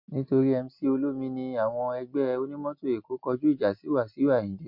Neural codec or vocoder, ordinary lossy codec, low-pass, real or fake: none; MP3, 48 kbps; 5.4 kHz; real